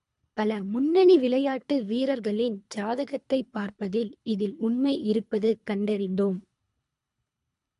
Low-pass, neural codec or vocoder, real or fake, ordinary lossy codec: 10.8 kHz; codec, 24 kHz, 3 kbps, HILCodec; fake; MP3, 64 kbps